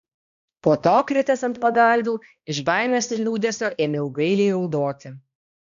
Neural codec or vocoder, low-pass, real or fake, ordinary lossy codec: codec, 16 kHz, 1 kbps, X-Codec, HuBERT features, trained on balanced general audio; 7.2 kHz; fake; AAC, 96 kbps